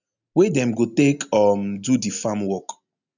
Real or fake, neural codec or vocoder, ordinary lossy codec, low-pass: real; none; none; 7.2 kHz